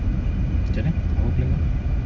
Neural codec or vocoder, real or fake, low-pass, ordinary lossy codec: none; real; 7.2 kHz; none